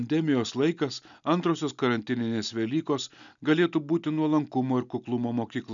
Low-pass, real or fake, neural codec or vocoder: 7.2 kHz; real; none